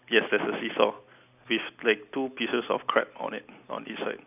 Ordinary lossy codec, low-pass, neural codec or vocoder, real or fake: none; 3.6 kHz; none; real